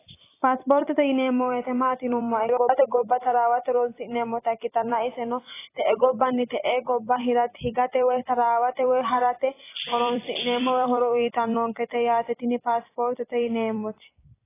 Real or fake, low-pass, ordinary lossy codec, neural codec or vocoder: fake; 3.6 kHz; AAC, 16 kbps; vocoder, 44.1 kHz, 128 mel bands every 256 samples, BigVGAN v2